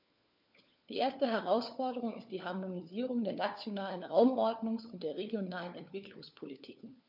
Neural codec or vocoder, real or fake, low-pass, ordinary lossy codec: codec, 16 kHz, 4 kbps, FunCodec, trained on LibriTTS, 50 frames a second; fake; 5.4 kHz; none